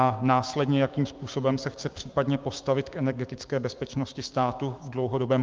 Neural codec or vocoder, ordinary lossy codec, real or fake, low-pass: codec, 16 kHz, 6 kbps, DAC; Opus, 32 kbps; fake; 7.2 kHz